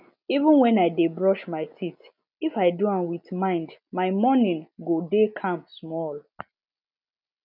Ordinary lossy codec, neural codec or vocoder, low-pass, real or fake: none; none; 5.4 kHz; real